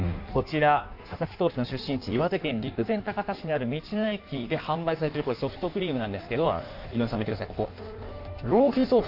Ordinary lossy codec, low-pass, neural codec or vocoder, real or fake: AAC, 48 kbps; 5.4 kHz; codec, 16 kHz in and 24 kHz out, 1.1 kbps, FireRedTTS-2 codec; fake